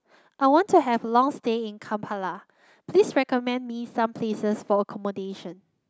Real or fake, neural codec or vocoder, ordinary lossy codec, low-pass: real; none; none; none